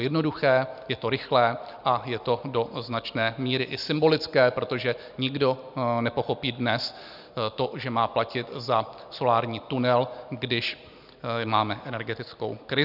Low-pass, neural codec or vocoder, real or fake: 5.4 kHz; none; real